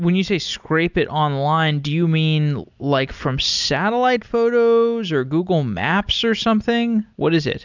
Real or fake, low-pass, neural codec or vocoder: real; 7.2 kHz; none